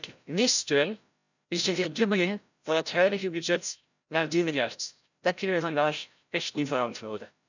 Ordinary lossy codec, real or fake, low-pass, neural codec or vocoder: none; fake; 7.2 kHz; codec, 16 kHz, 0.5 kbps, FreqCodec, larger model